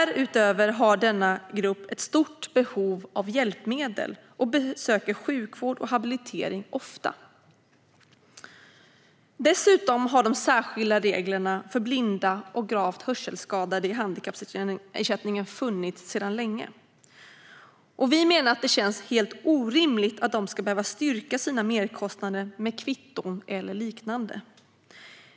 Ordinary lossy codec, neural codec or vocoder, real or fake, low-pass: none; none; real; none